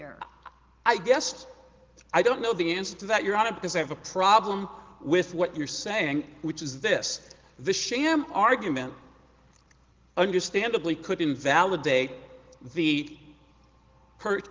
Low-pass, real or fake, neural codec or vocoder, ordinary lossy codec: 7.2 kHz; real; none; Opus, 16 kbps